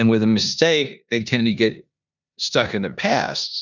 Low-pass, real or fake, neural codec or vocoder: 7.2 kHz; fake; codec, 16 kHz in and 24 kHz out, 0.9 kbps, LongCat-Audio-Codec, four codebook decoder